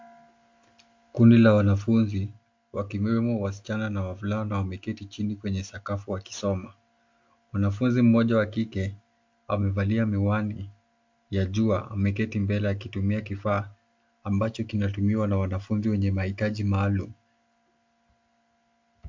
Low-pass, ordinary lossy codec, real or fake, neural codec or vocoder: 7.2 kHz; MP3, 48 kbps; real; none